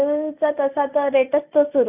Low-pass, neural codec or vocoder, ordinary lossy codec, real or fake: 3.6 kHz; none; none; real